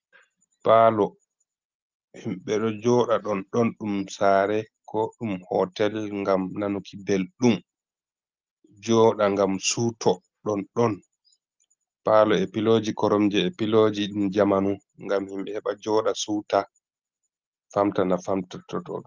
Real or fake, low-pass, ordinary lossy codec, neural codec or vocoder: real; 7.2 kHz; Opus, 24 kbps; none